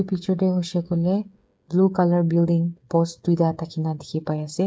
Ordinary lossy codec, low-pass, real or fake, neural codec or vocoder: none; none; fake; codec, 16 kHz, 8 kbps, FreqCodec, smaller model